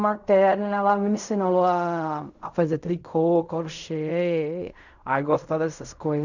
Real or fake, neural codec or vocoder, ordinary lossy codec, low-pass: fake; codec, 16 kHz in and 24 kHz out, 0.4 kbps, LongCat-Audio-Codec, fine tuned four codebook decoder; none; 7.2 kHz